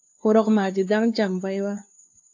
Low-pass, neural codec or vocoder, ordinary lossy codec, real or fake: 7.2 kHz; codec, 16 kHz, 2 kbps, FunCodec, trained on LibriTTS, 25 frames a second; AAC, 48 kbps; fake